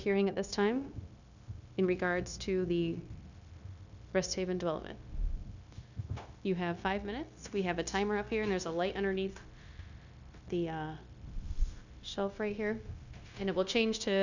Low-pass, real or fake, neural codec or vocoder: 7.2 kHz; fake; codec, 16 kHz, 0.9 kbps, LongCat-Audio-Codec